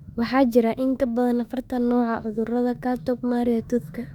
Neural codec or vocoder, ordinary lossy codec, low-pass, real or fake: autoencoder, 48 kHz, 32 numbers a frame, DAC-VAE, trained on Japanese speech; none; 19.8 kHz; fake